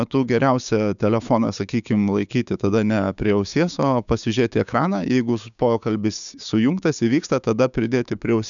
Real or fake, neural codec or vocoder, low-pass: fake; codec, 16 kHz, 6 kbps, DAC; 7.2 kHz